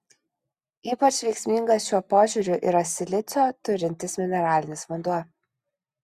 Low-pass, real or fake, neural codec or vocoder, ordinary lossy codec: 14.4 kHz; real; none; Opus, 64 kbps